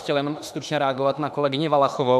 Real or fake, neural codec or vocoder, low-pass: fake; autoencoder, 48 kHz, 32 numbers a frame, DAC-VAE, trained on Japanese speech; 14.4 kHz